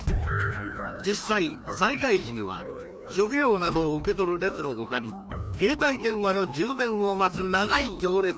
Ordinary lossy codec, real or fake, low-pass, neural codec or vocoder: none; fake; none; codec, 16 kHz, 1 kbps, FreqCodec, larger model